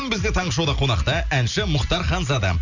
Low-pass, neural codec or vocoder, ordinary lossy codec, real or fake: 7.2 kHz; none; none; real